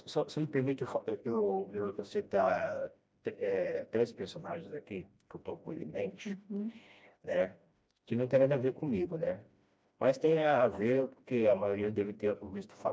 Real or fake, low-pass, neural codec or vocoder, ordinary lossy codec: fake; none; codec, 16 kHz, 1 kbps, FreqCodec, smaller model; none